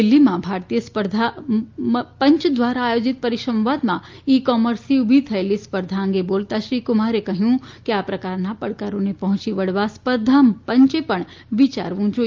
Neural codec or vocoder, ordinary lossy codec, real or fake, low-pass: none; Opus, 24 kbps; real; 7.2 kHz